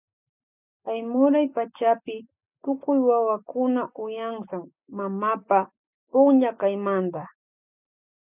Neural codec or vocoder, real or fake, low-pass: none; real; 3.6 kHz